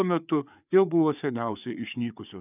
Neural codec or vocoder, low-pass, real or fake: codec, 16 kHz, 4 kbps, FreqCodec, larger model; 3.6 kHz; fake